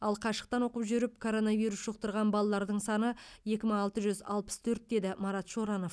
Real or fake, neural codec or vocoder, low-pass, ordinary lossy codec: real; none; none; none